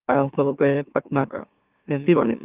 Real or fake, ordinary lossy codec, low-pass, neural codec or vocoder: fake; Opus, 24 kbps; 3.6 kHz; autoencoder, 44.1 kHz, a latent of 192 numbers a frame, MeloTTS